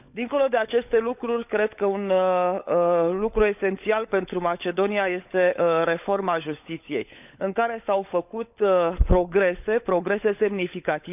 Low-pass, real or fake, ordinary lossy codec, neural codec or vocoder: 3.6 kHz; fake; none; codec, 16 kHz, 16 kbps, FunCodec, trained on LibriTTS, 50 frames a second